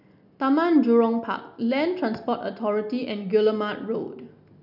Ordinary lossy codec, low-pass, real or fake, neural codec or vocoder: none; 5.4 kHz; real; none